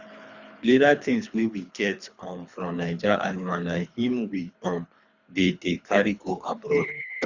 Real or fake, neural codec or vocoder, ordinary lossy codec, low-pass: fake; codec, 24 kHz, 3 kbps, HILCodec; Opus, 64 kbps; 7.2 kHz